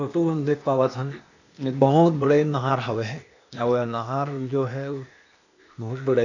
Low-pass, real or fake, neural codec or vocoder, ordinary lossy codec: 7.2 kHz; fake; codec, 16 kHz, 0.8 kbps, ZipCodec; none